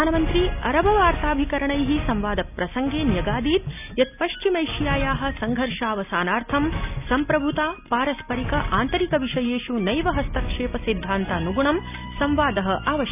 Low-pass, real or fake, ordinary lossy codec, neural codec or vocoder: 3.6 kHz; real; none; none